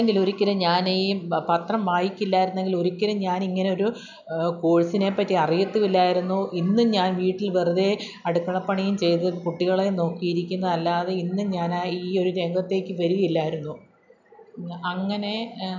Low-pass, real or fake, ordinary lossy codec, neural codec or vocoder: 7.2 kHz; real; none; none